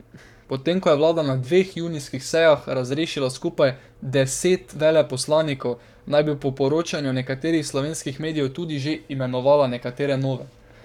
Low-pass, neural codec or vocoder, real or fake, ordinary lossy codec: 19.8 kHz; codec, 44.1 kHz, 7.8 kbps, Pupu-Codec; fake; none